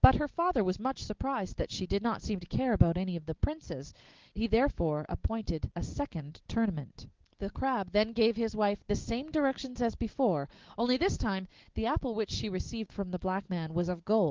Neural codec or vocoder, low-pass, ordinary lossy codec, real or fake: none; 7.2 kHz; Opus, 16 kbps; real